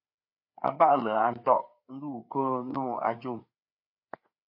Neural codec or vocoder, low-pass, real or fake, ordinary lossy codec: codec, 16 kHz, 4 kbps, FreqCodec, larger model; 5.4 kHz; fake; MP3, 32 kbps